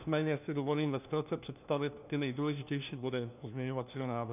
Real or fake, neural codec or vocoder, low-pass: fake; codec, 16 kHz, 1 kbps, FunCodec, trained on LibriTTS, 50 frames a second; 3.6 kHz